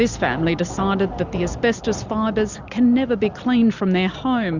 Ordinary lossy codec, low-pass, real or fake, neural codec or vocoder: Opus, 64 kbps; 7.2 kHz; real; none